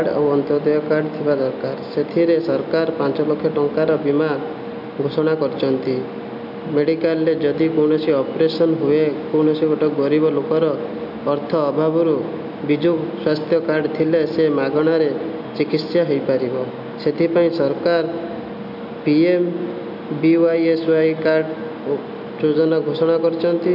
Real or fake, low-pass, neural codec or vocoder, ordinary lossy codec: real; 5.4 kHz; none; none